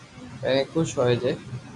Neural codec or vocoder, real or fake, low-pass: none; real; 10.8 kHz